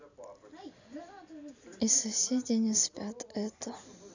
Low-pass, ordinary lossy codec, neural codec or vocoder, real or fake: 7.2 kHz; none; none; real